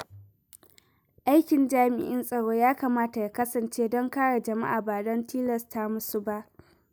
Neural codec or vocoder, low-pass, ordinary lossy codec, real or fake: none; none; none; real